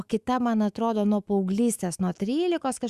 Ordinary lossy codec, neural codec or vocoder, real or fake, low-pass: Opus, 64 kbps; autoencoder, 48 kHz, 128 numbers a frame, DAC-VAE, trained on Japanese speech; fake; 14.4 kHz